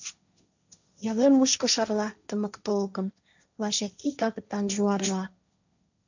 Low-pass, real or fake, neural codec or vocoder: 7.2 kHz; fake; codec, 16 kHz, 1.1 kbps, Voila-Tokenizer